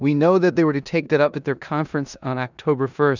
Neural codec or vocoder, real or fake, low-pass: codec, 16 kHz in and 24 kHz out, 0.9 kbps, LongCat-Audio-Codec, four codebook decoder; fake; 7.2 kHz